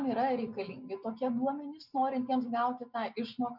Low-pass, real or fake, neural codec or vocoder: 5.4 kHz; real; none